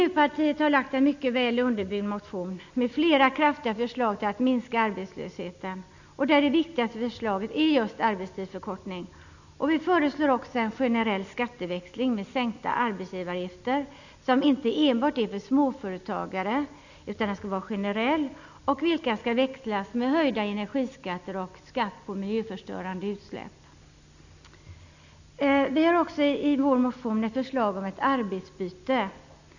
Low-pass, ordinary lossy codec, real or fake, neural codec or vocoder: 7.2 kHz; none; real; none